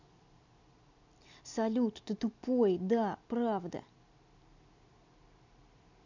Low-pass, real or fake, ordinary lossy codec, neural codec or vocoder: 7.2 kHz; real; none; none